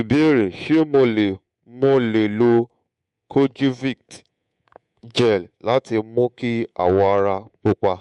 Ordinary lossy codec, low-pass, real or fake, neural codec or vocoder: MP3, 64 kbps; 10.8 kHz; fake; codec, 24 kHz, 3.1 kbps, DualCodec